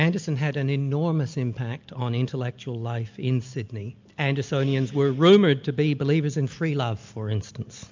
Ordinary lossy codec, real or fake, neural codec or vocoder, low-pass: MP3, 64 kbps; real; none; 7.2 kHz